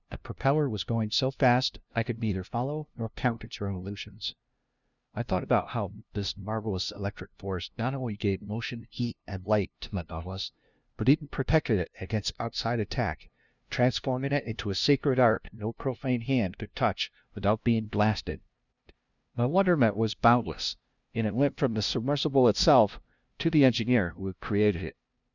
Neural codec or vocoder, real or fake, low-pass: codec, 16 kHz, 0.5 kbps, FunCodec, trained on LibriTTS, 25 frames a second; fake; 7.2 kHz